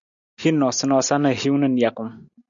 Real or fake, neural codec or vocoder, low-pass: real; none; 7.2 kHz